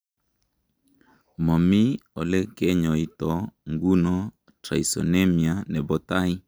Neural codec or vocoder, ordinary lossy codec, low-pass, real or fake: none; none; none; real